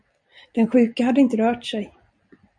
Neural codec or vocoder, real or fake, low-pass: none; real; 9.9 kHz